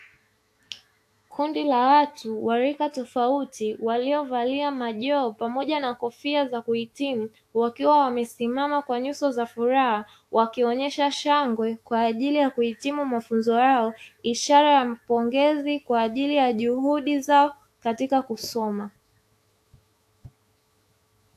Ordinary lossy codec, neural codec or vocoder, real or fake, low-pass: AAC, 64 kbps; autoencoder, 48 kHz, 128 numbers a frame, DAC-VAE, trained on Japanese speech; fake; 14.4 kHz